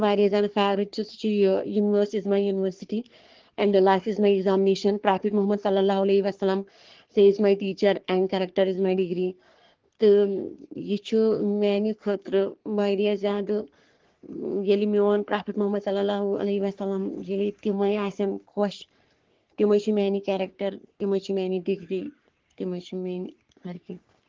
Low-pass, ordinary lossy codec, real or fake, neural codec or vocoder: 7.2 kHz; Opus, 16 kbps; fake; codec, 44.1 kHz, 3.4 kbps, Pupu-Codec